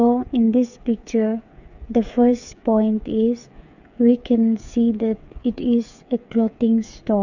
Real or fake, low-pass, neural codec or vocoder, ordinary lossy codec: fake; 7.2 kHz; codec, 16 kHz, 8 kbps, FreqCodec, smaller model; none